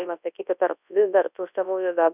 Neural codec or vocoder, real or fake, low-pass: codec, 24 kHz, 0.9 kbps, WavTokenizer, large speech release; fake; 3.6 kHz